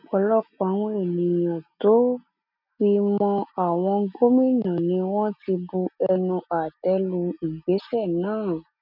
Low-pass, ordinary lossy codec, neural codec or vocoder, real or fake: 5.4 kHz; none; none; real